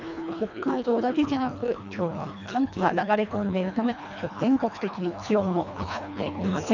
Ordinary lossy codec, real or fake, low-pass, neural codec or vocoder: none; fake; 7.2 kHz; codec, 24 kHz, 1.5 kbps, HILCodec